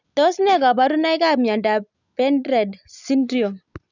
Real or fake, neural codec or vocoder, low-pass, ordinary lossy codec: real; none; 7.2 kHz; none